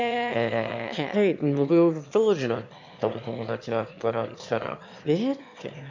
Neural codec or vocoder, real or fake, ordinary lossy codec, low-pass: autoencoder, 22.05 kHz, a latent of 192 numbers a frame, VITS, trained on one speaker; fake; MP3, 64 kbps; 7.2 kHz